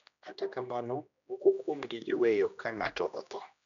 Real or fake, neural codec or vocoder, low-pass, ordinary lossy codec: fake; codec, 16 kHz, 1 kbps, X-Codec, HuBERT features, trained on general audio; 7.2 kHz; none